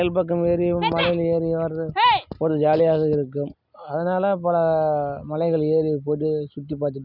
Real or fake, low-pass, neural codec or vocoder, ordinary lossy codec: real; 5.4 kHz; none; none